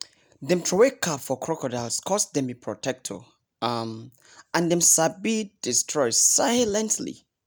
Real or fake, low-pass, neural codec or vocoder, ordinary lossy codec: real; none; none; none